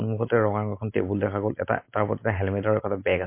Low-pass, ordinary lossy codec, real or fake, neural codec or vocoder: 3.6 kHz; MP3, 24 kbps; real; none